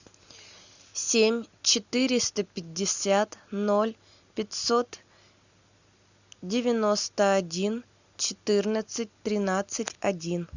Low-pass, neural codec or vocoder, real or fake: 7.2 kHz; none; real